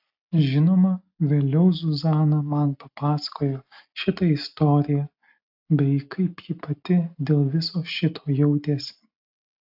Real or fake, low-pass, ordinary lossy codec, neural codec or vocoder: real; 5.4 kHz; AAC, 48 kbps; none